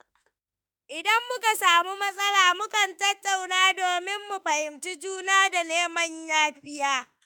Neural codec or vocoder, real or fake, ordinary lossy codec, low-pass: autoencoder, 48 kHz, 32 numbers a frame, DAC-VAE, trained on Japanese speech; fake; none; none